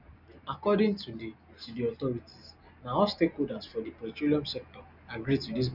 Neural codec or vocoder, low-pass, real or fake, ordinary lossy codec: none; 5.4 kHz; real; none